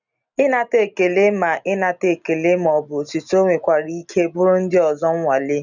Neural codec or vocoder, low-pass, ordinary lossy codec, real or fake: none; 7.2 kHz; none; real